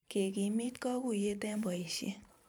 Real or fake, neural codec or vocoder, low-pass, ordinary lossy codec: fake; vocoder, 44.1 kHz, 128 mel bands every 512 samples, BigVGAN v2; none; none